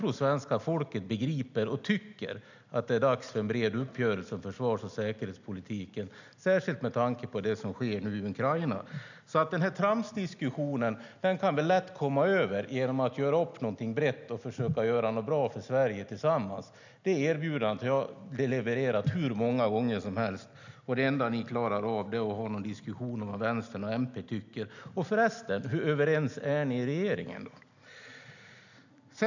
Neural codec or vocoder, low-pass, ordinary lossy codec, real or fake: none; 7.2 kHz; none; real